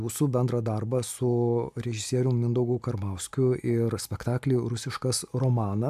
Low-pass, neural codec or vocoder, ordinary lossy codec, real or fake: 14.4 kHz; none; AAC, 96 kbps; real